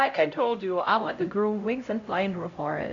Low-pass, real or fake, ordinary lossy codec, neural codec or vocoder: 7.2 kHz; fake; AAC, 48 kbps; codec, 16 kHz, 0.5 kbps, X-Codec, HuBERT features, trained on LibriSpeech